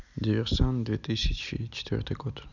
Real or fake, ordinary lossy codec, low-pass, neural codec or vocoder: real; none; 7.2 kHz; none